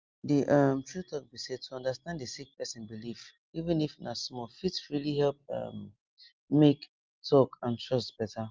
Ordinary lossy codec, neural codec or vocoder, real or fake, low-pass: Opus, 24 kbps; none; real; 7.2 kHz